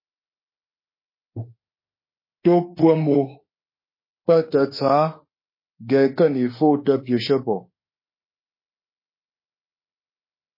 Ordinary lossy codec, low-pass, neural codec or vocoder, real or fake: MP3, 24 kbps; 5.4 kHz; autoencoder, 48 kHz, 32 numbers a frame, DAC-VAE, trained on Japanese speech; fake